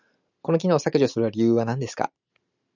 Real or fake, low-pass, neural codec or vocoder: real; 7.2 kHz; none